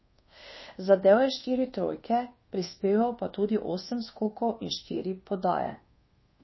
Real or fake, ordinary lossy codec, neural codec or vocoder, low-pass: fake; MP3, 24 kbps; codec, 24 kHz, 1.2 kbps, DualCodec; 7.2 kHz